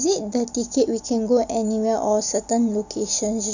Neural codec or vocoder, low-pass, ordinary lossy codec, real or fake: none; 7.2 kHz; none; real